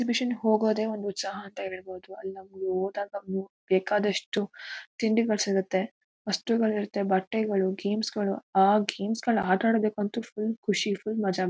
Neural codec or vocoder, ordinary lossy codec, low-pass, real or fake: none; none; none; real